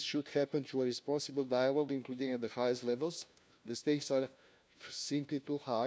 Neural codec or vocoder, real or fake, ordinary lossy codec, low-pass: codec, 16 kHz, 1 kbps, FunCodec, trained on LibriTTS, 50 frames a second; fake; none; none